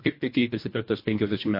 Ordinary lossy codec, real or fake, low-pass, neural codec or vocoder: MP3, 32 kbps; fake; 5.4 kHz; codec, 24 kHz, 1.5 kbps, HILCodec